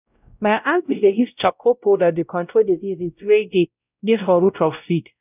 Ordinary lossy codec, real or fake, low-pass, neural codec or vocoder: none; fake; 3.6 kHz; codec, 16 kHz, 0.5 kbps, X-Codec, WavLM features, trained on Multilingual LibriSpeech